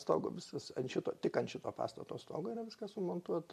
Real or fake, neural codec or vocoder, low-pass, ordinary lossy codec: real; none; 14.4 kHz; AAC, 96 kbps